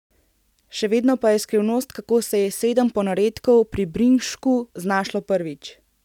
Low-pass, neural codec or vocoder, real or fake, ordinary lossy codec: 19.8 kHz; none; real; none